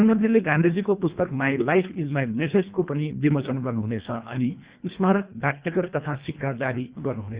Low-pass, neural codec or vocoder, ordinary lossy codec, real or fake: 3.6 kHz; codec, 24 kHz, 1.5 kbps, HILCodec; Opus, 64 kbps; fake